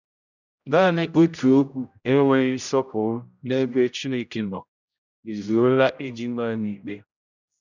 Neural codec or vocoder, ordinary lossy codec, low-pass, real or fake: codec, 16 kHz, 0.5 kbps, X-Codec, HuBERT features, trained on general audio; none; 7.2 kHz; fake